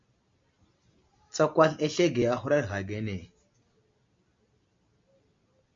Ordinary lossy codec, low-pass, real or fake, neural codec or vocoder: MP3, 48 kbps; 7.2 kHz; real; none